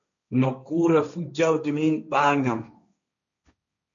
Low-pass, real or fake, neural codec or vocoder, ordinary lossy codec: 7.2 kHz; fake; codec, 16 kHz, 1.1 kbps, Voila-Tokenizer; MP3, 96 kbps